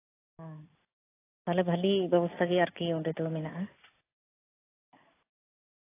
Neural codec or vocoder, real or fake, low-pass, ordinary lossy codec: none; real; 3.6 kHz; AAC, 16 kbps